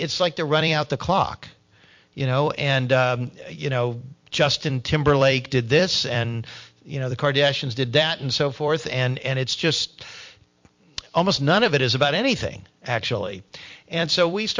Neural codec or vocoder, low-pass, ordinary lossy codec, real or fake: vocoder, 44.1 kHz, 128 mel bands every 256 samples, BigVGAN v2; 7.2 kHz; MP3, 48 kbps; fake